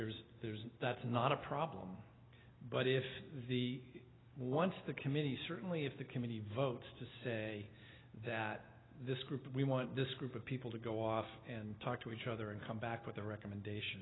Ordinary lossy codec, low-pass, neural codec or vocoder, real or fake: AAC, 16 kbps; 7.2 kHz; none; real